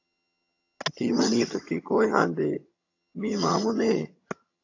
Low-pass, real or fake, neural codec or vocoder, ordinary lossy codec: 7.2 kHz; fake; vocoder, 22.05 kHz, 80 mel bands, HiFi-GAN; AAC, 48 kbps